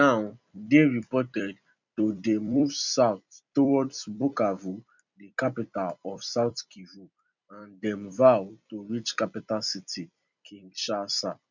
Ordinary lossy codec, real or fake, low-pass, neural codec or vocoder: none; fake; 7.2 kHz; vocoder, 44.1 kHz, 128 mel bands every 256 samples, BigVGAN v2